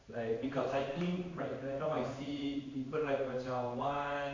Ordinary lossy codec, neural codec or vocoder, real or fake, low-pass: AAC, 32 kbps; codec, 16 kHz in and 24 kHz out, 1 kbps, XY-Tokenizer; fake; 7.2 kHz